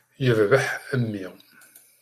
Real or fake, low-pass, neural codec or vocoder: real; 14.4 kHz; none